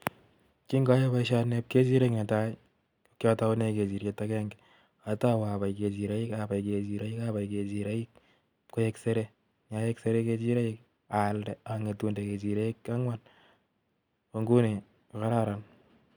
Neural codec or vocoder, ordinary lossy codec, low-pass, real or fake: none; none; 19.8 kHz; real